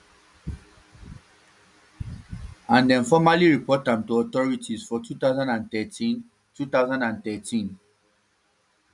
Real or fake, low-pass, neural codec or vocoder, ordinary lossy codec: real; 10.8 kHz; none; none